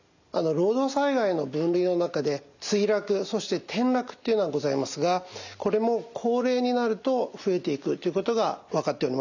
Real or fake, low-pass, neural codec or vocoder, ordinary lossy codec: real; 7.2 kHz; none; none